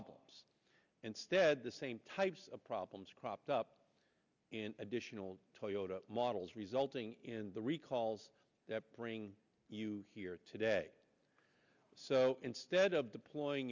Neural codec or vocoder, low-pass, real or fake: none; 7.2 kHz; real